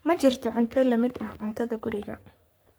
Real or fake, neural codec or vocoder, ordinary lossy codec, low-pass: fake; codec, 44.1 kHz, 3.4 kbps, Pupu-Codec; none; none